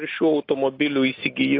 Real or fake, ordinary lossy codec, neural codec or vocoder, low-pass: real; AAC, 32 kbps; none; 5.4 kHz